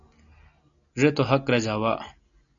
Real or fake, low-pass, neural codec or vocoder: real; 7.2 kHz; none